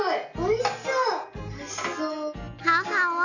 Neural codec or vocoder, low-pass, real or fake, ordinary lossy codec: none; 7.2 kHz; real; none